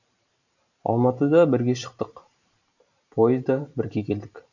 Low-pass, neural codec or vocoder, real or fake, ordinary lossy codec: 7.2 kHz; vocoder, 44.1 kHz, 128 mel bands every 512 samples, BigVGAN v2; fake; none